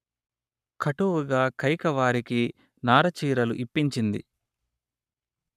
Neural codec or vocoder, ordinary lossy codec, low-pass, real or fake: codec, 44.1 kHz, 7.8 kbps, Pupu-Codec; none; 14.4 kHz; fake